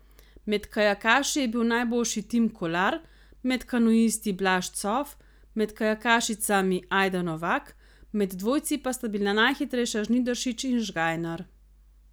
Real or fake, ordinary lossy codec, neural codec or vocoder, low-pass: real; none; none; none